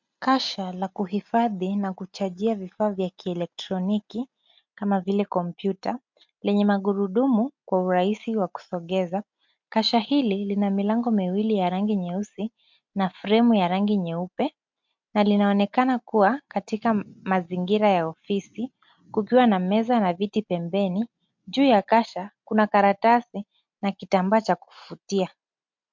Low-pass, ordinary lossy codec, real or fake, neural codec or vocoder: 7.2 kHz; MP3, 64 kbps; real; none